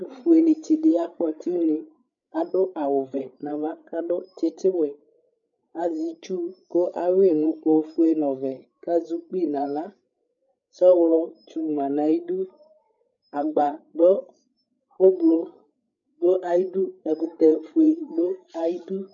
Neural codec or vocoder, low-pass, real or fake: codec, 16 kHz, 8 kbps, FreqCodec, larger model; 7.2 kHz; fake